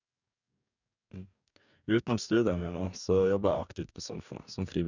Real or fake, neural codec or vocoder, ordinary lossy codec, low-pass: fake; codec, 44.1 kHz, 2.6 kbps, DAC; none; 7.2 kHz